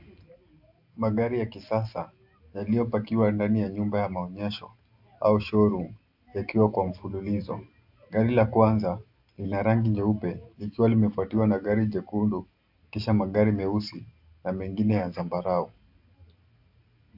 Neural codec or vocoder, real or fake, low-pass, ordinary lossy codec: none; real; 5.4 kHz; AAC, 48 kbps